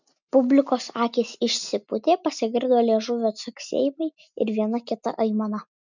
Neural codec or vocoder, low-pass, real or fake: none; 7.2 kHz; real